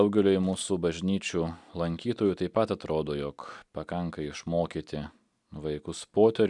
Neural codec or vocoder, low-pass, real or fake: none; 10.8 kHz; real